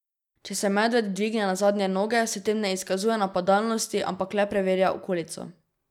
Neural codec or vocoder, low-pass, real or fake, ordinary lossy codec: none; 19.8 kHz; real; none